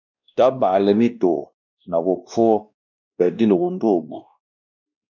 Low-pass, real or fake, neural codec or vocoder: 7.2 kHz; fake; codec, 16 kHz, 1 kbps, X-Codec, WavLM features, trained on Multilingual LibriSpeech